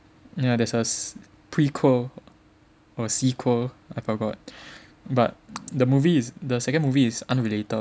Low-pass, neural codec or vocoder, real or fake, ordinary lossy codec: none; none; real; none